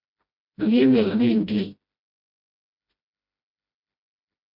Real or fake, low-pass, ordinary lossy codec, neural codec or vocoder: fake; 5.4 kHz; MP3, 48 kbps; codec, 16 kHz, 0.5 kbps, FreqCodec, smaller model